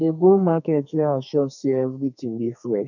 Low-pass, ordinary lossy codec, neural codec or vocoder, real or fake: 7.2 kHz; none; codec, 32 kHz, 1.9 kbps, SNAC; fake